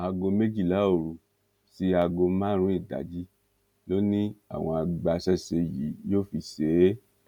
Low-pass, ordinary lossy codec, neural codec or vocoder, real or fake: 19.8 kHz; none; none; real